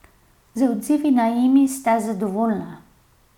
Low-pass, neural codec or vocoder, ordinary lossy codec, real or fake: 19.8 kHz; none; none; real